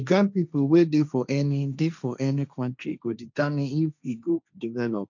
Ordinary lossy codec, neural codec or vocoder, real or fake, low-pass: none; codec, 16 kHz, 1.1 kbps, Voila-Tokenizer; fake; 7.2 kHz